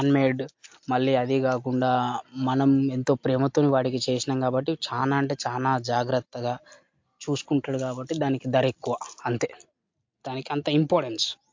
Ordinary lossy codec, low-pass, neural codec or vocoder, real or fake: MP3, 48 kbps; 7.2 kHz; none; real